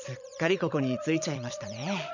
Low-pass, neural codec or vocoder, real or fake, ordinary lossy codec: 7.2 kHz; vocoder, 22.05 kHz, 80 mel bands, Vocos; fake; none